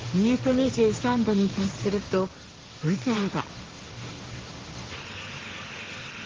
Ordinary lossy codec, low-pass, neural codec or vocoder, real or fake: Opus, 16 kbps; 7.2 kHz; codec, 16 kHz, 1.1 kbps, Voila-Tokenizer; fake